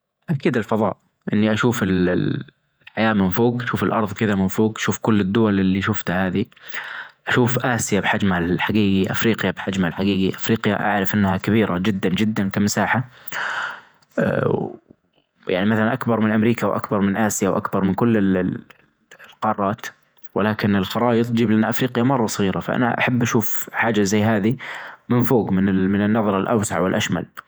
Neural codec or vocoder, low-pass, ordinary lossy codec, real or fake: vocoder, 48 kHz, 128 mel bands, Vocos; none; none; fake